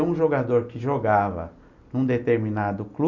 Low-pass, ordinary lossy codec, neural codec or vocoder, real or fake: 7.2 kHz; none; none; real